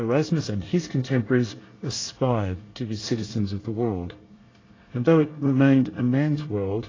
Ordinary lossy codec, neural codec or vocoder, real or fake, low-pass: AAC, 32 kbps; codec, 24 kHz, 1 kbps, SNAC; fake; 7.2 kHz